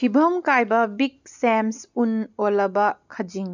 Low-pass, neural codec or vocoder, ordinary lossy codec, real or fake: 7.2 kHz; none; none; real